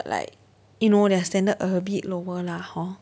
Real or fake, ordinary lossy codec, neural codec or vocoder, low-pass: real; none; none; none